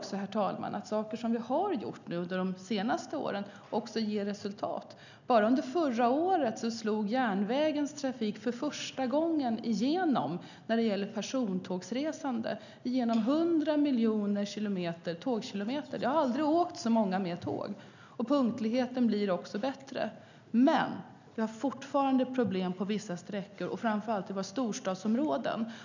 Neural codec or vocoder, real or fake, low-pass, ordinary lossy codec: none; real; 7.2 kHz; none